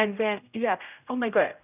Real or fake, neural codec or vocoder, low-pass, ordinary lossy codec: fake; codec, 16 kHz, 0.5 kbps, X-Codec, HuBERT features, trained on general audio; 3.6 kHz; none